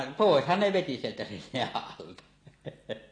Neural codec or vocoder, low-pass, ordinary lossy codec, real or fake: none; 9.9 kHz; AAC, 32 kbps; real